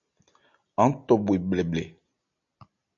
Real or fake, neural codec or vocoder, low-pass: real; none; 7.2 kHz